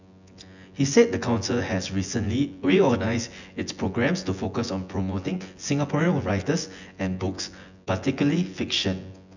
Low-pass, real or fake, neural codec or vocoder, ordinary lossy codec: 7.2 kHz; fake; vocoder, 24 kHz, 100 mel bands, Vocos; none